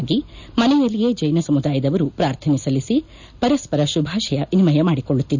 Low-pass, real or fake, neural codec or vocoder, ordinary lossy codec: 7.2 kHz; real; none; none